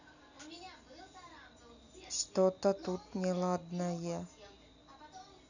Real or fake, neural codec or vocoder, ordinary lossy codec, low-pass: real; none; none; 7.2 kHz